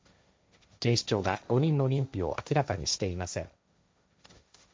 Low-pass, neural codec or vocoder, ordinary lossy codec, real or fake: none; codec, 16 kHz, 1.1 kbps, Voila-Tokenizer; none; fake